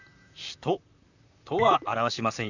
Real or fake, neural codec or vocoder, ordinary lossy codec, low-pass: fake; vocoder, 44.1 kHz, 128 mel bands, Pupu-Vocoder; none; 7.2 kHz